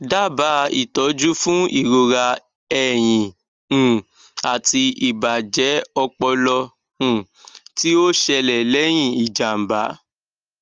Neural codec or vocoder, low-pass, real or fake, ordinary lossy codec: none; 7.2 kHz; real; Opus, 32 kbps